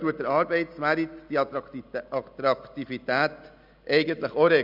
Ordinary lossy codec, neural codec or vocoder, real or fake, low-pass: none; none; real; 5.4 kHz